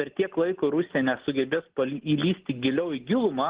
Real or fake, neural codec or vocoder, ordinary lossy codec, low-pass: real; none; Opus, 16 kbps; 3.6 kHz